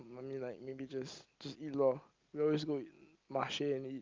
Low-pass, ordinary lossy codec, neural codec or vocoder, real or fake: 7.2 kHz; Opus, 32 kbps; none; real